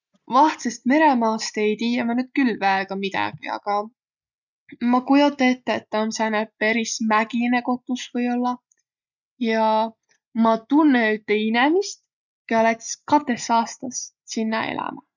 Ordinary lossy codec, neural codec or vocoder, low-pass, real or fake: none; none; 7.2 kHz; real